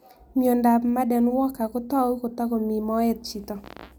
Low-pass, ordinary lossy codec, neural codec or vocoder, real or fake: none; none; none; real